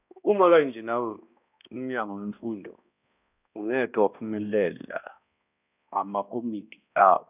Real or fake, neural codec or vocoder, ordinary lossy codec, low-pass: fake; codec, 16 kHz, 1 kbps, X-Codec, HuBERT features, trained on balanced general audio; none; 3.6 kHz